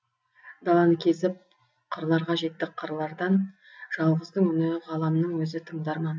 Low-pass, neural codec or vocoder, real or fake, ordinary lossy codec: none; none; real; none